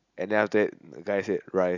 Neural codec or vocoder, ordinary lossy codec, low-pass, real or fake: none; none; 7.2 kHz; real